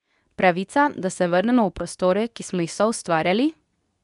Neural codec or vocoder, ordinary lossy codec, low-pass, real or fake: codec, 24 kHz, 0.9 kbps, WavTokenizer, medium speech release version 2; none; 10.8 kHz; fake